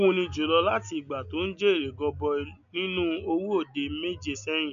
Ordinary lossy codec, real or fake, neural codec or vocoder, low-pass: none; real; none; 7.2 kHz